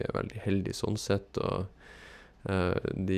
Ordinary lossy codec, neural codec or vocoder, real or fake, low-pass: none; none; real; 14.4 kHz